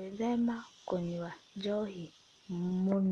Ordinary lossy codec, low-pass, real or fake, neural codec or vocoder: Opus, 32 kbps; 19.8 kHz; real; none